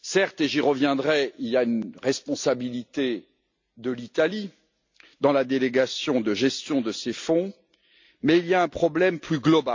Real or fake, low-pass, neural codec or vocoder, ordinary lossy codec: real; 7.2 kHz; none; MP3, 64 kbps